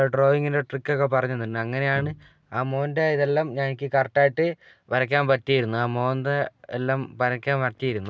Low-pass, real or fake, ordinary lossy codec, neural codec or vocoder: none; real; none; none